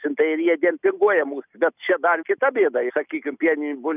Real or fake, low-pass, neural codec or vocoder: real; 3.6 kHz; none